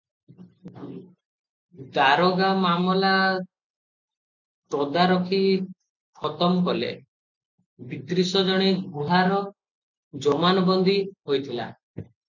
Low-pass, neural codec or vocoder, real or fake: 7.2 kHz; none; real